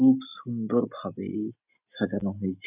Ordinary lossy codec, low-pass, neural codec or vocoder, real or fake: none; 3.6 kHz; none; real